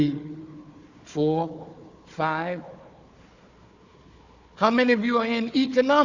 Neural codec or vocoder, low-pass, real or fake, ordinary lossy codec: codec, 16 kHz, 4 kbps, FunCodec, trained on Chinese and English, 50 frames a second; 7.2 kHz; fake; Opus, 64 kbps